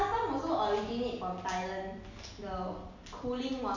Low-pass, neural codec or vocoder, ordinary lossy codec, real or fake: 7.2 kHz; none; none; real